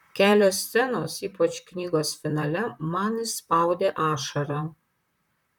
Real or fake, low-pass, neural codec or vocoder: fake; 19.8 kHz; vocoder, 44.1 kHz, 128 mel bands, Pupu-Vocoder